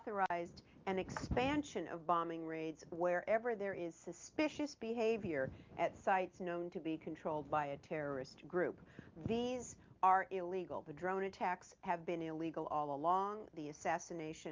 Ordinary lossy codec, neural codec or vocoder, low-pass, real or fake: Opus, 24 kbps; none; 7.2 kHz; real